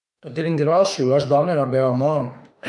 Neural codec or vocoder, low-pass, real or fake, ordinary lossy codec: autoencoder, 48 kHz, 32 numbers a frame, DAC-VAE, trained on Japanese speech; 10.8 kHz; fake; none